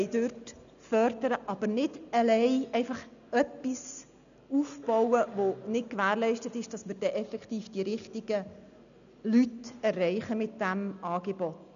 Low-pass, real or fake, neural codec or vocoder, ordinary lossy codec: 7.2 kHz; real; none; none